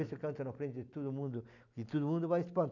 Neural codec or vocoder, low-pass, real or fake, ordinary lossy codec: none; 7.2 kHz; real; none